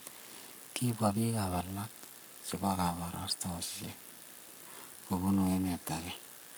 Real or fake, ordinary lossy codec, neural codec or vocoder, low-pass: fake; none; codec, 44.1 kHz, 7.8 kbps, Pupu-Codec; none